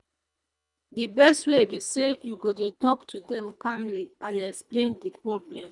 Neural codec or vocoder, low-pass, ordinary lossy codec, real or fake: codec, 24 kHz, 1.5 kbps, HILCodec; 10.8 kHz; none; fake